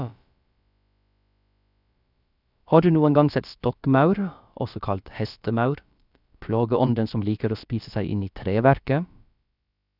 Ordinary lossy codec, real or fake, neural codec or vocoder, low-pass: none; fake; codec, 16 kHz, about 1 kbps, DyCAST, with the encoder's durations; 5.4 kHz